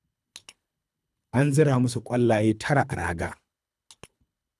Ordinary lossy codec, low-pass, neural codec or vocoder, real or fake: none; none; codec, 24 kHz, 3 kbps, HILCodec; fake